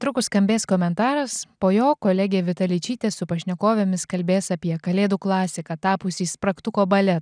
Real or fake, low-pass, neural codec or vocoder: real; 9.9 kHz; none